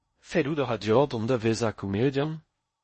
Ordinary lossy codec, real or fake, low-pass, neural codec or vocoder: MP3, 32 kbps; fake; 10.8 kHz; codec, 16 kHz in and 24 kHz out, 0.6 kbps, FocalCodec, streaming, 4096 codes